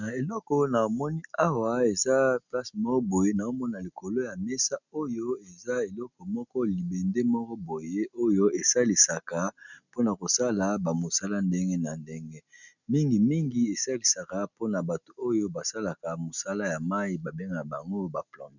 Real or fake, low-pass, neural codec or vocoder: real; 7.2 kHz; none